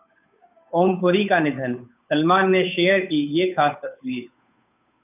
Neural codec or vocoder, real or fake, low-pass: codec, 16 kHz, 8 kbps, FunCodec, trained on Chinese and English, 25 frames a second; fake; 3.6 kHz